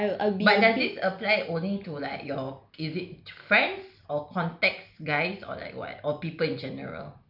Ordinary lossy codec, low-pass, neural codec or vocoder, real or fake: none; 5.4 kHz; none; real